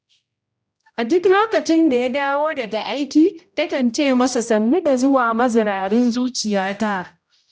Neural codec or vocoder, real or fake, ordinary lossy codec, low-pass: codec, 16 kHz, 0.5 kbps, X-Codec, HuBERT features, trained on general audio; fake; none; none